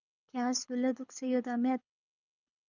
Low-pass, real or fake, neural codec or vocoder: 7.2 kHz; fake; codec, 24 kHz, 6 kbps, HILCodec